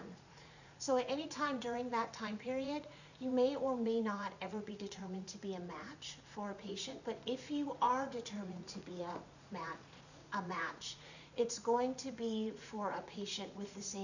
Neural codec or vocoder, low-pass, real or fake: vocoder, 44.1 kHz, 80 mel bands, Vocos; 7.2 kHz; fake